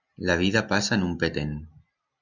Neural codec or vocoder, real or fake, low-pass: none; real; 7.2 kHz